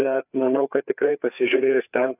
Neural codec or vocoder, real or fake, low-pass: codec, 16 kHz, 4 kbps, FreqCodec, larger model; fake; 3.6 kHz